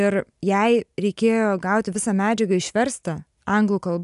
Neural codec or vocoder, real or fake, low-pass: none; real; 10.8 kHz